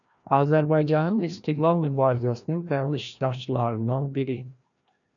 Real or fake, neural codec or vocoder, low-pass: fake; codec, 16 kHz, 1 kbps, FreqCodec, larger model; 7.2 kHz